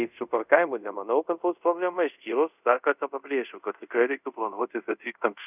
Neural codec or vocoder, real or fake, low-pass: codec, 24 kHz, 0.5 kbps, DualCodec; fake; 3.6 kHz